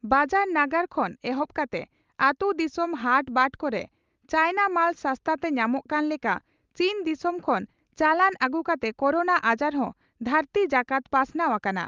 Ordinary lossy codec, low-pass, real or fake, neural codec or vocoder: Opus, 32 kbps; 7.2 kHz; real; none